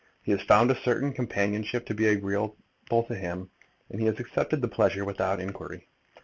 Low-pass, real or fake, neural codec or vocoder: 7.2 kHz; real; none